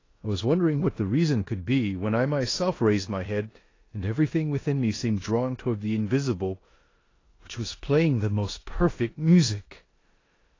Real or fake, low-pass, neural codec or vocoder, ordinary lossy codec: fake; 7.2 kHz; codec, 16 kHz in and 24 kHz out, 0.9 kbps, LongCat-Audio-Codec, four codebook decoder; AAC, 32 kbps